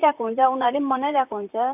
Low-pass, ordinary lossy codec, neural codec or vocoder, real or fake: 3.6 kHz; AAC, 32 kbps; vocoder, 44.1 kHz, 128 mel bands, Pupu-Vocoder; fake